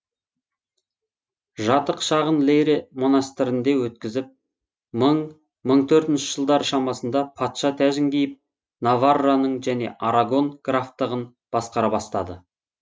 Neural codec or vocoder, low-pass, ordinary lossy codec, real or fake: none; none; none; real